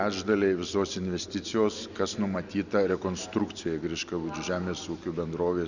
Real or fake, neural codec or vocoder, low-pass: real; none; 7.2 kHz